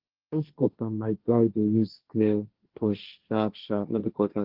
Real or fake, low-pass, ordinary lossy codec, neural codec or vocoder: fake; 5.4 kHz; Opus, 16 kbps; codec, 16 kHz, 1.1 kbps, Voila-Tokenizer